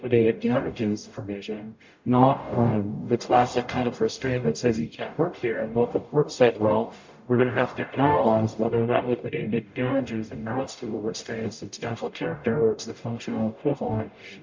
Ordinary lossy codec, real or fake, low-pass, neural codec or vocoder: MP3, 48 kbps; fake; 7.2 kHz; codec, 44.1 kHz, 0.9 kbps, DAC